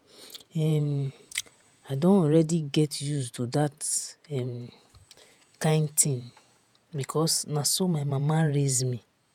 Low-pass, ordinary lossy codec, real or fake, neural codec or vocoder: 19.8 kHz; none; fake; vocoder, 48 kHz, 128 mel bands, Vocos